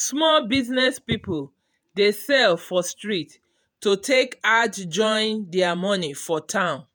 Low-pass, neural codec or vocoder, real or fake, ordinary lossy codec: none; vocoder, 48 kHz, 128 mel bands, Vocos; fake; none